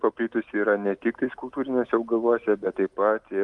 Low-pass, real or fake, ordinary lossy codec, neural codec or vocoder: 10.8 kHz; real; Opus, 32 kbps; none